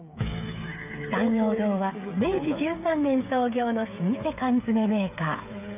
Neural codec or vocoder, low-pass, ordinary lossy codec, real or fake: codec, 16 kHz, 8 kbps, FreqCodec, smaller model; 3.6 kHz; none; fake